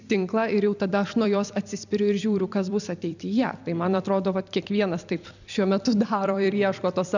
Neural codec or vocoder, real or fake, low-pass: vocoder, 44.1 kHz, 128 mel bands every 256 samples, BigVGAN v2; fake; 7.2 kHz